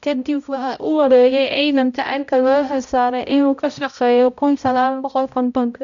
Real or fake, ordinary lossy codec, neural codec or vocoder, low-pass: fake; none; codec, 16 kHz, 0.5 kbps, X-Codec, HuBERT features, trained on balanced general audio; 7.2 kHz